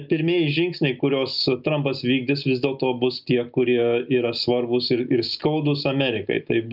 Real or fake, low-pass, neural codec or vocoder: real; 5.4 kHz; none